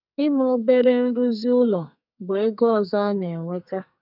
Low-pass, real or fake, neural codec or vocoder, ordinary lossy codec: 5.4 kHz; fake; codec, 44.1 kHz, 2.6 kbps, SNAC; none